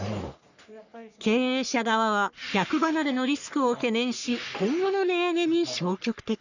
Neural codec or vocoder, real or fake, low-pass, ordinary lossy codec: codec, 44.1 kHz, 3.4 kbps, Pupu-Codec; fake; 7.2 kHz; none